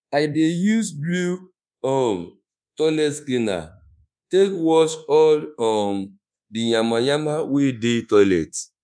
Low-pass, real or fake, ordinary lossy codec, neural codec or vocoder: 9.9 kHz; fake; none; codec, 24 kHz, 1.2 kbps, DualCodec